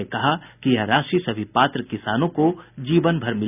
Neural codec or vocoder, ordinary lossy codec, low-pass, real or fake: none; none; 3.6 kHz; real